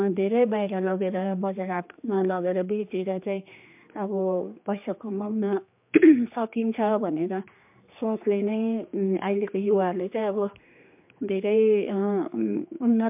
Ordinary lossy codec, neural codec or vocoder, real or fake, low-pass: MP3, 32 kbps; codec, 16 kHz, 2 kbps, X-Codec, HuBERT features, trained on general audio; fake; 3.6 kHz